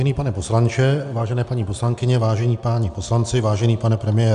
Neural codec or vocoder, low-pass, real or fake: none; 10.8 kHz; real